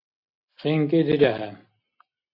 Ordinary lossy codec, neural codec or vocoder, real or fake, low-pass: AAC, 32 kbps; none; real; 5.4 kHz